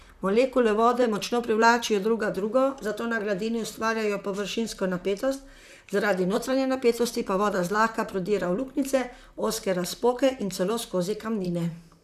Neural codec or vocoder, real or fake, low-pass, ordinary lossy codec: vocoder, 44.1 kHz, 128 mel bands, Pupu-Vocoder; fake; 14.4 kHz; none